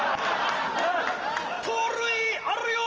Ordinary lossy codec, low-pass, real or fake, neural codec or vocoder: Opus, 24 kbps; 7.2 kHz; real; none